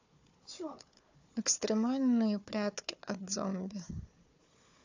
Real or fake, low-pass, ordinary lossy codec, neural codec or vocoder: fake; 7.2 kHz; AAC, 48 kbps; codec, 16 kHz, 4 kbps, FunCodec, trained on Chinese and English, 50 frames a second